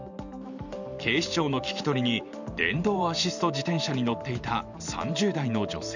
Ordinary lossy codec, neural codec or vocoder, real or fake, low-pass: none; vocoder, 44.1 kHz, 128 mel bands every 512 samples, BigVGAN v2; fake; 7.2 kHz